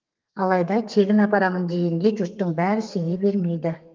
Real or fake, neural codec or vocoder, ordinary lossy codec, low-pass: fake; codec, 32 kHz, 1.9 kbps, SNAC; Opus, 24 kbps; 7.2 kHz